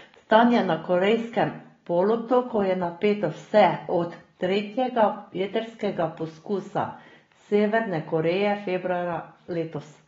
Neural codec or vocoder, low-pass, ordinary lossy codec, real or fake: none; 7.2 kHz; AAC, 24 kbps; real